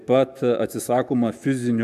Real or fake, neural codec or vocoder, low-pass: fake; autoencoder, 48 kHz, 128 numbers a frame, DAC-VAE, trained on Japanese speech; 14.4 kHz